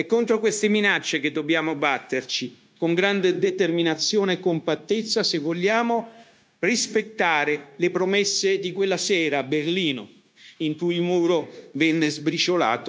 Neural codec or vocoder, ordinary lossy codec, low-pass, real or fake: codec, 16 kHz, 0.9 kbps, LongCat-Audio-Codec; none; none; fake